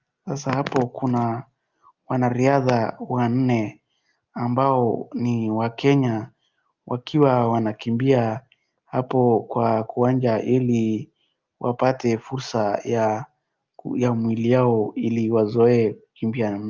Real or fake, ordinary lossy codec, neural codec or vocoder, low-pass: real; Opus, 24 kbps; none; 7.2 kHz